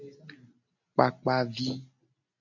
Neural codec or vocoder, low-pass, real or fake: none; 7.2 kHz; real